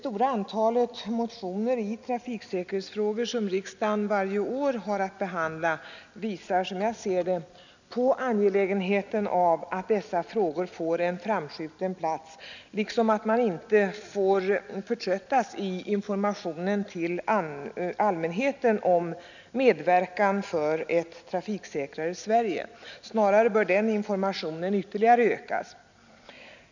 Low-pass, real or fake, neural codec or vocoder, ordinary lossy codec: 7.2 kHz; real; none; none